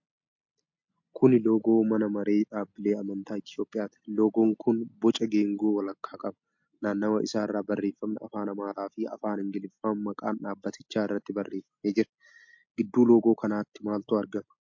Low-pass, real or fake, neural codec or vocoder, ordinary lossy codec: 7.2 kHz; real; none; MP3, 48 kbps